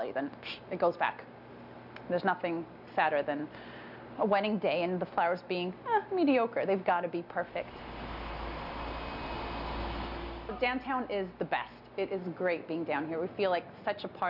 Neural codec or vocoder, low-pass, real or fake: none; 5.4 kHz; real